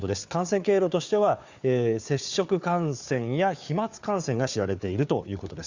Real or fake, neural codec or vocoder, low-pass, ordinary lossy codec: fake; codec, 16 kHz, 4 kbps, FunCodec, trained on Chinese and English, 50 frames a second; 7.2 kHz; Opus, 64 kbps